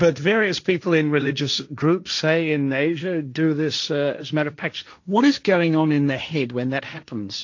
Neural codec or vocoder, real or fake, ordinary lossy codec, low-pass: codec, 16 kHz, 1.1 kbps, Voila-Tokenizer; fake; AAC, 48 kbps; 7.2 kHz